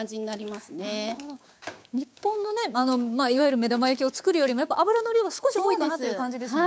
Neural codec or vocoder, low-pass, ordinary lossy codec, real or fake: codec, 16 kHz, 6 kbps, DAC; none; none; fake